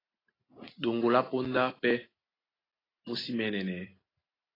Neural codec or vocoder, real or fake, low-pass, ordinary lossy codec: none; real; 5.4 kHz; AAC, 24 kbps